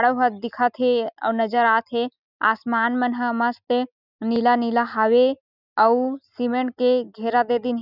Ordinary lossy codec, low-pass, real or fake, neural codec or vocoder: none; 5.4 kHz; real; none